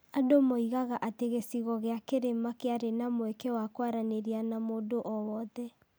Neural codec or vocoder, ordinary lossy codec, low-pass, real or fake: none; none; none; real